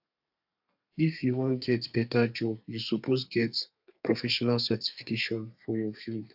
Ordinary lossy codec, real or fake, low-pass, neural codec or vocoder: AAC, 48 kbps; fake; 5.4 kHz; codec, 32 kHz, 1.9 kbps, SNAC